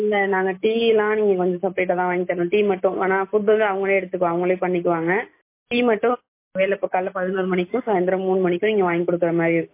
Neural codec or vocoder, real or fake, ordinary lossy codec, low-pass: none; real; MP3, 24 kbps; 3.6 kHz